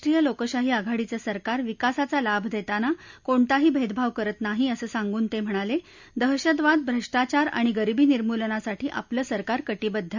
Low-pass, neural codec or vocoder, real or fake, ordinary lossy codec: 7.2 kHz; none; real; none